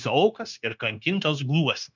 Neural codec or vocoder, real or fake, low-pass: codec, 16 kHz, 0.9 kbps, LongCat-Audio-Codec; fake; 7.2 kHz